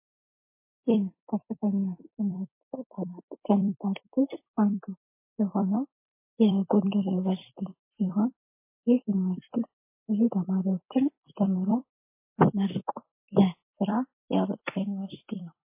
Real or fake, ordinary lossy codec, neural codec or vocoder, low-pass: fake; MP3, 24 kbps; vocoder, 44.1 kHz, 128 mel bands every 256 samples, BigVGAN v2; 3.6 kHz